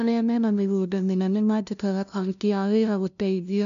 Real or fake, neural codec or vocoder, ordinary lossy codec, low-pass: fake; codec, 16 kHz, 0.5 kbps, FunCodec, trained on LibriTTS, 25 frames a second; none; 7.2 kHz